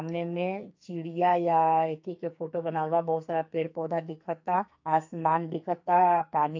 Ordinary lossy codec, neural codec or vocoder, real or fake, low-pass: AAC, 48 kbps; codec, 44.1 kHz, 2.6 kbps, SNAC; fake; 7.2 kHz